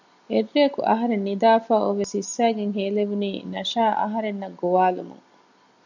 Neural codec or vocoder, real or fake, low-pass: none; real; 7.2 kHz